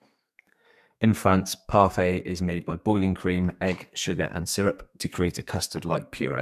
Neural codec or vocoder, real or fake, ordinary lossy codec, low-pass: codec, 32 kHz, 1.9 kbps, SNAC; fake; none; 14.4 kHz